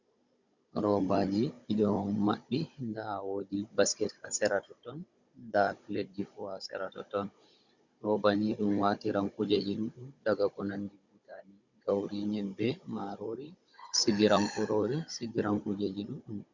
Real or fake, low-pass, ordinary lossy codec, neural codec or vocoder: fake; 7.2 kHz; Opus, 64 kbps; codec, 16 kHz, 16 kbps, FunCodec, trained on Chinese and English, 50 frames a second